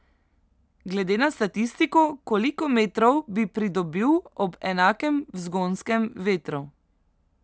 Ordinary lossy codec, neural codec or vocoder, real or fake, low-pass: none; none; real; none